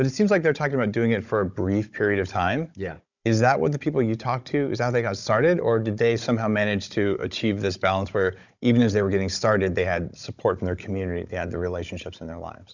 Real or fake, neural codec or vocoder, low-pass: fake; codec, 16 kHz, 16 kbps, FunCodec, trained on Chinese and English, 50 frames a second; 7.2 kHz